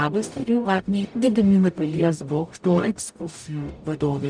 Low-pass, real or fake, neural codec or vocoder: 9.9 kHz; fake; codec, 44.1 kHz, 0.9 kbps, DAC